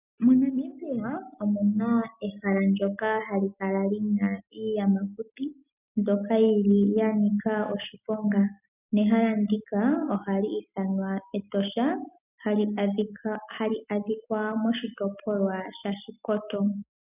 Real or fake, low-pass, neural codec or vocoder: real; 3.6 kHz; none